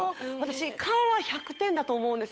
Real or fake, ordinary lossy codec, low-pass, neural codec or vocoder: fake; none; none; codec, 16 kHz, 8 kbps, FunCodec, trained on Chinese and English, 25 frames a second